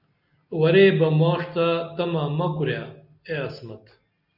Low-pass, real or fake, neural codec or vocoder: 5.4 kHz; real; none